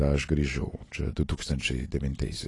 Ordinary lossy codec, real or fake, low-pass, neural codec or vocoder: AAC, 32 kbps; real; 10.8 kHz; none